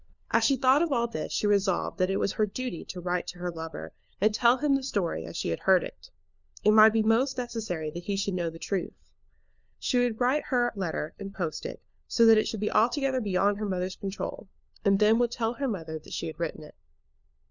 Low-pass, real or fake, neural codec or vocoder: 7.2 kHz; fake; codec, 16 kHz, 4 kbps, FunCodec, trained on LibriTTS, 50 frames a second